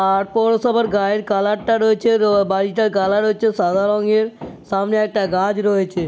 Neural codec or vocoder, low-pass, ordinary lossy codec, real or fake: none; none; none; real